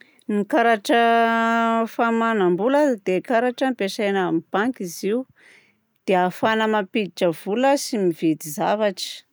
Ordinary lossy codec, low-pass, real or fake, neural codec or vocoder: none; none; real; none